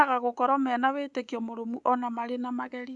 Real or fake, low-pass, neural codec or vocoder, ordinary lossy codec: fake; 10.8 kHz; codec, 24 kHz, 3.1 kbps, DualCodec; Opus, 64 kbps